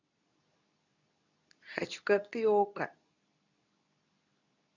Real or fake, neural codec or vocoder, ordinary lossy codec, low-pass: fake; codec, 24 kHz, 0.9 kbps, WavTokenizer, medium speech release version 2; none; 7.2 kHz